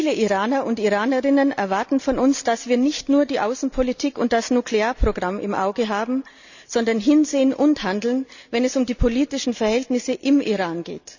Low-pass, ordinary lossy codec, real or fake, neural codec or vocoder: 7.2 kHz; none; real; none